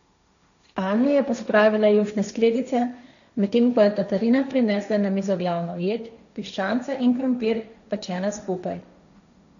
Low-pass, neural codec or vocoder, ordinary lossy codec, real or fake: 7.2 kHz; codec, 16 kHz, 1.1 kbps, Voila-Tokenizer; none; fake